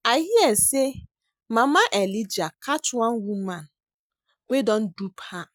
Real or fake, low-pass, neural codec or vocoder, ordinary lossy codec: real; none; none; none